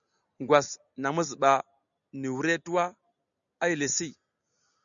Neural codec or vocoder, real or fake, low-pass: none; real; 7.2 kHz